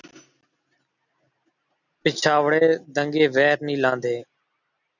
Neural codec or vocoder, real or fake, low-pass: none; real; 7.2 kHz